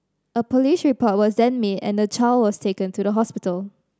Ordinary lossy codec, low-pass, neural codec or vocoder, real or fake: none; none; none; real